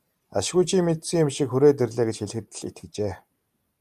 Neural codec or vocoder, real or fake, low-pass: vocoder, 44.1 kHz, 128 mel bands every 512 samples, BigVGAN v2; fake; 14.4 kHz